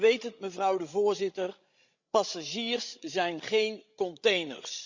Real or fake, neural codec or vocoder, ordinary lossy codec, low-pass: fake; codec, 16 kHz, 16 kbps, FreqCodec, larger model; Opus, 64 kbps; 7.2 kHz